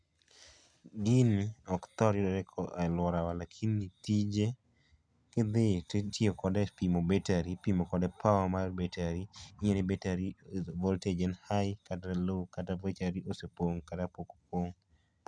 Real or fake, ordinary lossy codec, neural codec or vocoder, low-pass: real; none; none; 9.9 kHz